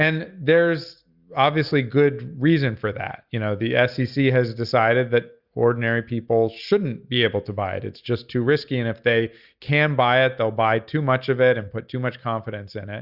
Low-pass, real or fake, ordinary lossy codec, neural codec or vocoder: 5.4 kHz; real; Opus, 64 kbps; none